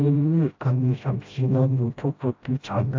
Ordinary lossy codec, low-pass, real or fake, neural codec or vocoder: AAC, 48 kbps; 7.2 kHz; fake; codec, 16 kHz, 0.5 kbps, FreqCodec, smaller model